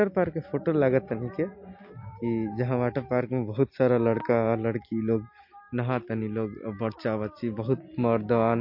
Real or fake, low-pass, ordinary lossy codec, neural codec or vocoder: real; 5.4 kHz; MP3, 32 kbps; none